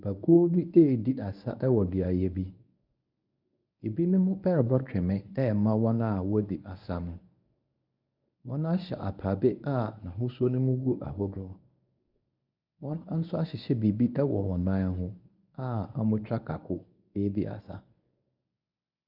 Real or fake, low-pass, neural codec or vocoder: fake; 5.4 kHz; codec, 24 kHz, 0.9 kbps, WavTokenizer, medium speech release version 1